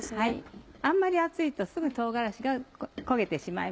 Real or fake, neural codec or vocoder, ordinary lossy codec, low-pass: real; none; none; none